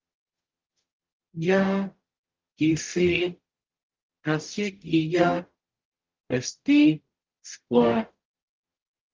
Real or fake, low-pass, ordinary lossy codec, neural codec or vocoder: fake; 7.2 kHz; Opus, 32 kbps; codec, 44.1 kHz, 0.9 kbps, DAC